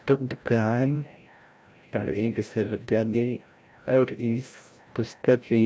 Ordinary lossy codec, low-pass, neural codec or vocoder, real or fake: none; none; codec, 16 kHz, 0.5 kbps, FreqCodec, larger model; fake